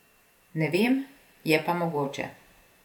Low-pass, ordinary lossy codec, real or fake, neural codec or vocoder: 19.8 kHz; none; fake; vocoder, 44.1 kHz, 128 mel bands every 512 samples, BigVGAN v2